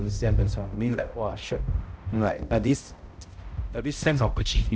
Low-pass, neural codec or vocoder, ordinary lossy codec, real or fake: none; codec, 16 kHz, 0.5 kbps, X-Codec, HuBERT features, trained on balanced general audio; none; fake